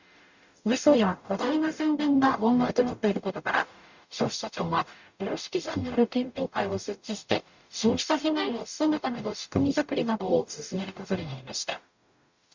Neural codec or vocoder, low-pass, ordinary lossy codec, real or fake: codec, 44.1 kHz, 0.9 kbps, DAC; 7.2 kHz; Opus, 64 kbps; fake